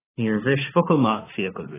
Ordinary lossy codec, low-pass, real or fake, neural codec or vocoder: AAC, 16 kbps; 3.6 kHz; fake; autoencoder, 48 kHz, 32 numbers a frame, DAC-VAE, trained on Japanese speech